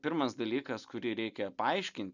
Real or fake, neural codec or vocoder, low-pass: real; none; 7.2 kHz